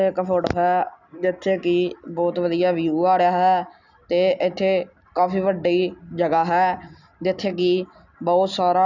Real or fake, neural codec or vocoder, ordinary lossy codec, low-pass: real; none; none; 7.2 kHz